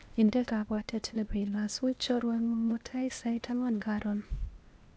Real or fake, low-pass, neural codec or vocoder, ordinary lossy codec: fake; none; codec, 16 kHz, 0.8 kbps, ZipCodec; none